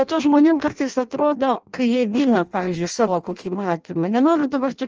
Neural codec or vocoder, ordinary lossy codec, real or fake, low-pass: codec, 16 kHz in and 24 kHz out, 0.6 kbps, FireRedTTS-2 codec; Opus, 24 kbps; fake; 7.2 kHz